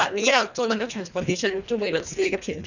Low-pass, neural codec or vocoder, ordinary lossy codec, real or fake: 7.2 kHz; codec, 24 kHz, 1.5 kbps, HILCodec; none; fake